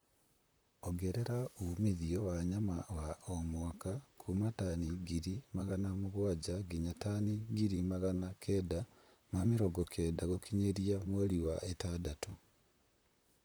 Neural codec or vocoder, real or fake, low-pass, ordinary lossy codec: vocoder, 44.1 kHz, 128 mel bands, Pupu-Vocoder; fake; none; none